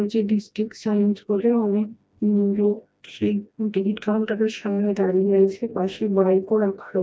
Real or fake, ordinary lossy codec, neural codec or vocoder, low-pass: fake; none; codec, 16 kHz, 1 kbps, FreqCodec, smaller model; none